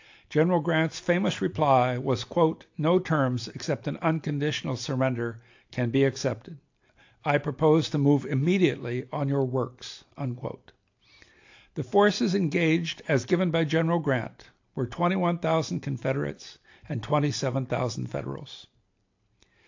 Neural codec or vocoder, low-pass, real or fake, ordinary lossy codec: none; 7.2 kHz; real; AAC, 48 kbps